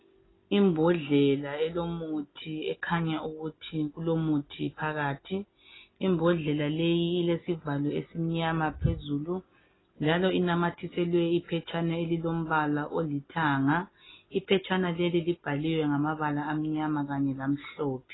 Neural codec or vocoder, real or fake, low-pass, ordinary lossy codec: none; real; 7.2 kHz; AAC, 16 kbps